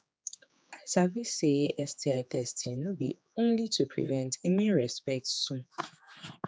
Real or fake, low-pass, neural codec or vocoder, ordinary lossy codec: fake; none; codec, 16 kHz, 4 kbps, X-Codec, HuBERT features, trained on general audio; none